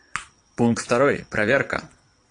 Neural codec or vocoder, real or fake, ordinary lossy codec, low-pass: vocoder, 22.05 kHz, 80 mel bands, Vocos; fake; AAC, 48 kbps; 9.9 kHz